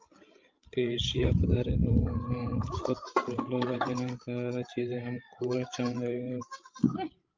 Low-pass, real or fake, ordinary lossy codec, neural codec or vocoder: 7.2 kHz; fake; Opus, 24 kbps; codec, 16 kHz, 16 kbps, FreqCodec, larger model